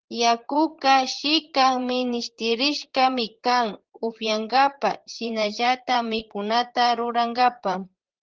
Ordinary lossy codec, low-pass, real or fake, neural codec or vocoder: Opus, 16 kbps; 7.2 kHz; fake; vocoder, 44.1 kHz, 128 mel bands, Pupu-Vocoder